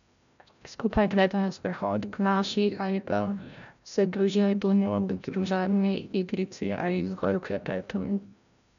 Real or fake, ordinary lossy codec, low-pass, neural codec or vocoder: fake; none; 7.2 kHz; codec, 16 kHz, 0.5 kbps, FreqCodec, larger model